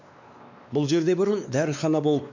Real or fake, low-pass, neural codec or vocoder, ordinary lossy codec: fake; 7.2 kHz; codec, 16 kHz, 2 kbps, X-Codec, WavLM features, trained on Multilingual LibriSpeech; none